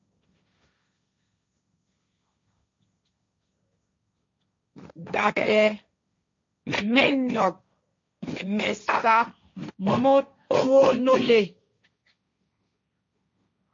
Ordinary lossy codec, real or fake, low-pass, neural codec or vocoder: MP3, 48 kbps; fake; 7.2 kHz; codec, 16 kHz, 1.1 kbps, Voila-Tokenizer